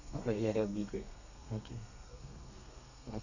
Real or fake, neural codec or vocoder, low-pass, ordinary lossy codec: fake; codec, 32 kHz, 1.9 kbps, SNAC; 7.2 kHz; none